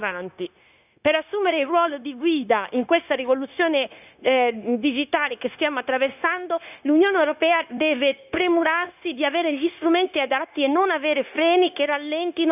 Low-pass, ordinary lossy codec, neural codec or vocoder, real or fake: 3.6 kHz; none; codec, 16 kHz, 0.9 kbps, LongCat-Audio-Codec; fake